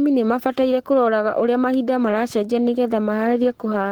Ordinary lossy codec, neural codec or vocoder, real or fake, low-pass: Opus, 16 kbps; codec, 44.1 kHz, 7.8 kbps, Pupu-Codec; fake; 19.8 kHz